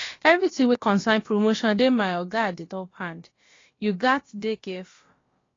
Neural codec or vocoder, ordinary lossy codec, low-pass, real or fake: codec, 16 kHz, about 1 kbps, DyCAST, with the encoder's durations; AAC, 32 kbps; 7.2 kHz; fake